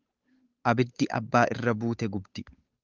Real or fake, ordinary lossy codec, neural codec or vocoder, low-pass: fake; Opus, 24 kbps; codec, 16 kHz, 8 kbps, FunCodec, trained on Chinese and English, 25 frames a second; 7.2 kHz